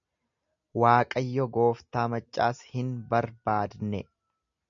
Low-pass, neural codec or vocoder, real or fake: 7.2 kHz; none; real